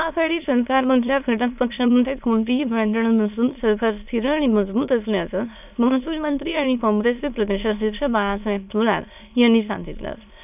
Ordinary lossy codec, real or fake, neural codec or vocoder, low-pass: none; fake; autoencoder, 22.05 kHz, a latent of 192 numbers a frame, VITS, trained on many speakers; 3.6 kHz